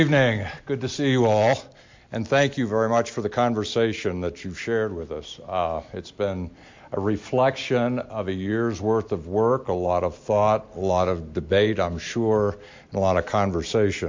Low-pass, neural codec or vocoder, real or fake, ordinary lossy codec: 7.2 kHz; none; real; MP3, 48 kbps